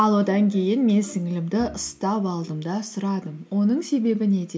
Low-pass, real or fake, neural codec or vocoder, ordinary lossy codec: none; real; none; none